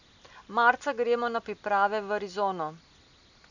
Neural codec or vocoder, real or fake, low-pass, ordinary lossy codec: none; real; 7.2 kHz; none